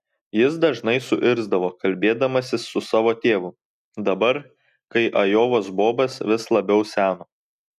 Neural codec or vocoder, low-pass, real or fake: none; 14.4 kHz; real